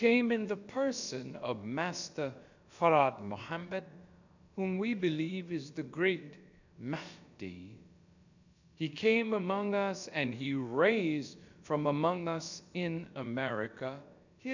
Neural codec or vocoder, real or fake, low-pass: codec, 16 kHz, about 1 kbps, DyCAST, with the encoder's durations; fake; 7.2 kHz